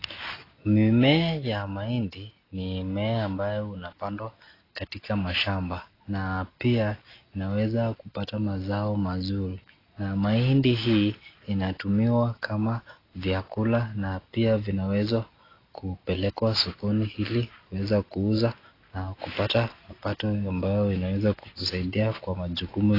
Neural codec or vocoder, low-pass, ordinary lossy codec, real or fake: none; 5.4 kHz; AAC, 24 kbps; real